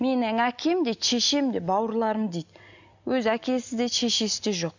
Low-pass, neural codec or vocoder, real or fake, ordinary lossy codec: 7.2 kHz; none; real; none